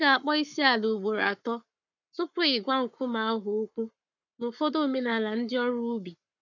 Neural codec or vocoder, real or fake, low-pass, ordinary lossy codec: codec, 44.1 kHz, 7.8 kbps, Pupu-Codec; fake; 7.2 kHz; none